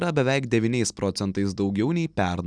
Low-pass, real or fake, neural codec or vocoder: 9.9 kHz; real; none